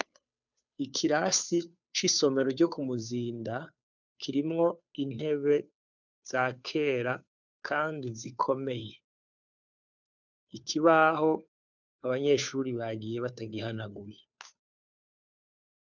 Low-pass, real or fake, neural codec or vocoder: 7.2 kHz; fake; codec, 16 kHz, 2 kbps, FunCodec, trained on Chinese and English, 25 frames a second